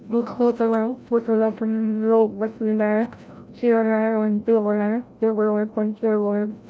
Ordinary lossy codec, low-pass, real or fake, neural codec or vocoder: none; none; fake; codec, 16 kHz, 0.5 kbps, FreqCodec, larger model